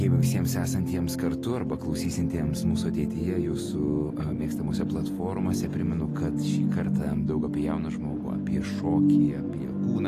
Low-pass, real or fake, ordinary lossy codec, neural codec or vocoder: 14.4 kHz; real; AAC, 48 kbps; none